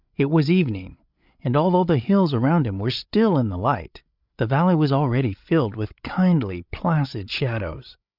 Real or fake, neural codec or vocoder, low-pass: fake; codec, 16 kHz, 8 kbps, FreqCodec, larger model; 5.4 kHz